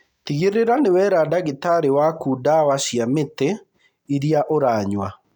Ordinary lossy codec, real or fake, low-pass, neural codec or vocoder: none; real; 19.8 kHz; none